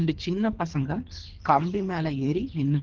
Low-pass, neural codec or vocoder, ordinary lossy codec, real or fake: 7.2 kHz; codec, 24 kHz, 3 kbps, HILCodec; Opus, 16 kbps; fake